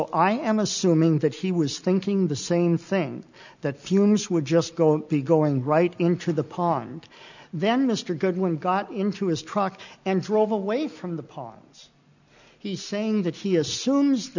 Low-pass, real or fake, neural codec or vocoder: 7.2 kHz; real; none